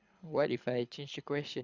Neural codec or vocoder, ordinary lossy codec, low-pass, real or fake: codec, 24 kHz, 6 kbps, HILCodec; Opus, 64 kbps; 7.2 kHz; fake